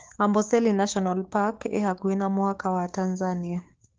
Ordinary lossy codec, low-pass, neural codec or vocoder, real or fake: Opus, 16 kbps; 7.2 kHz; codec, 16 kHz, 6 kbps, DAC; fake